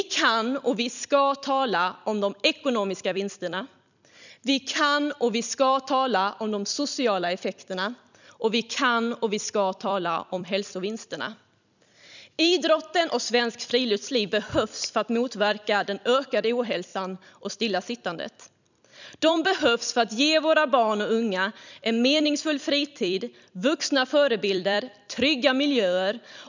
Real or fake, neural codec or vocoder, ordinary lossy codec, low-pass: fake; vocoder, 44.1 kHz, 128 mel bands every 256 samples, BigVGAN v2; none; 7.2 kHz